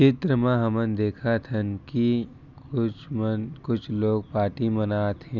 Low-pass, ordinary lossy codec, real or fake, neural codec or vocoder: 7.2 kHz; none; real; none